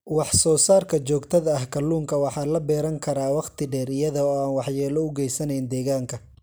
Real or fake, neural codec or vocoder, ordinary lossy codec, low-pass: real; none; none; none